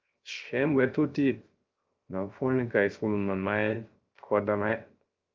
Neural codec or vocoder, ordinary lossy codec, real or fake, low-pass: codec, 16 kHz, 0.3 kbps, FocalCodec; Opus, 24 kbps; fake; 7.2 kHz